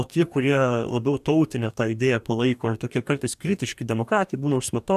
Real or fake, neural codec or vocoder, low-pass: fake; codec, 44.1 kHz, 2.6 kbps, DAC; 14.4 kHz